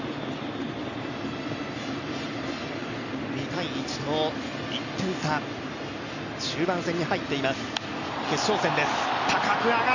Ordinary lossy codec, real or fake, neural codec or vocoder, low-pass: none; real; none; 7.2 kHz